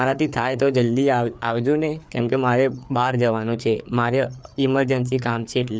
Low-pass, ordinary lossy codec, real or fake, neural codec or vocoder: none; none; fake; codec, 16 kHz, 4 kbps, FreqCodec, larger model